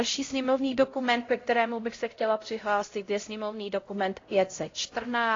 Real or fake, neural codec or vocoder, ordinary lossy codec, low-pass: fake; codec, 16 kHz, 0.5 kbps, X-Codec, HuBERT features, trained on LibriSpeech; AAC, 32 kbps; 7.2 kHz